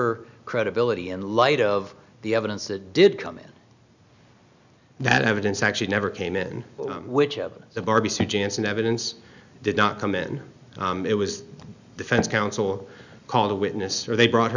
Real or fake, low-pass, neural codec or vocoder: real; 7.2 kHz; none